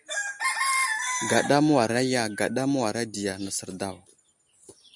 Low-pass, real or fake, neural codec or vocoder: 10.8 kHz; real; none